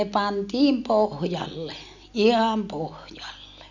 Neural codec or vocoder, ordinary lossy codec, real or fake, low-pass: none; none; real; 7.2 kHz